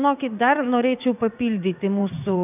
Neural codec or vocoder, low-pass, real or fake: vocoder, 44.1 kHz, 80 mel bands, Vocos; 3.6 kHz; fake